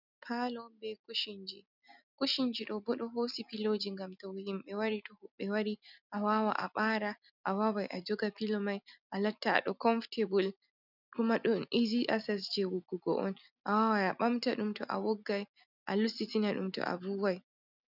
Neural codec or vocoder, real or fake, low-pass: none; real; 5.4 kHz